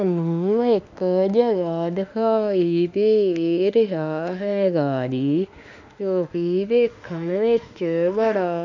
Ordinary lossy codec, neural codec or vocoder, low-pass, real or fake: none; autoencoder, 48 kHz, 32 numbers a frame, DAC-VAE, trained on Japanese speech; 7.2 kHz; fake